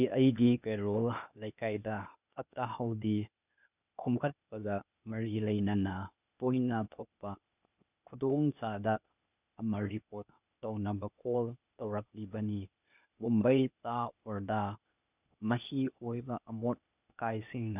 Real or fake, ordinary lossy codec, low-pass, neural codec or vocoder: fake; none; 3.6 kHz; codec, 16 kHz, 0.8 kbps, ZipCodec